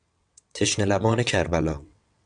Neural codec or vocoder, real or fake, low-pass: vocoder, 22.05 kHz, 80 mel bands, WaveNeXt; fake; 9.9 kHz